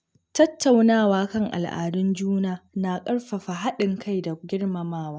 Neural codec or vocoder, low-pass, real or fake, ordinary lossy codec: none; none; real; none